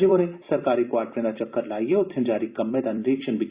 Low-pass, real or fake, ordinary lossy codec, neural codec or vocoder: 3.6 kHz; fake; Opus, 64 kbps; vocoder, 44.1 kHz, 128 mel bands every 256 samples, BigVGAN v2